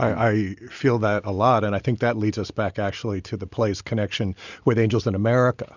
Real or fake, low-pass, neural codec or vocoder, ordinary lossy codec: real; 7.2 kHz; none; Opus, 64 kbps